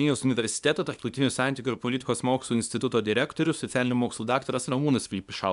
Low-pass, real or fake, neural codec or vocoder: 10.8 kHz; fake; codec, 24 kHz, 0.9 kbps, WavTokenizer, small release